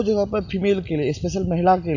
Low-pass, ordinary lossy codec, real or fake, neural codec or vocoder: 7.2 kHz; none; real; none